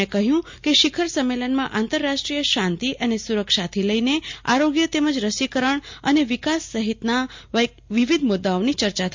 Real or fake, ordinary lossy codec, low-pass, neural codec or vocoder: real; MP3, 48 kbps; 7.2 kHz; none